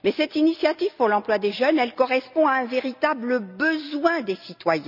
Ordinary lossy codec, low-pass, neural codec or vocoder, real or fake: none; 5.4 kHz; none; real